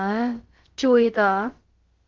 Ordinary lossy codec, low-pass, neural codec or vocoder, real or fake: Opus, 16 kbps; 7.2 kHz; codec, 16 kHz, about 1 kbps, DyCAST, with the encoder's durations; fake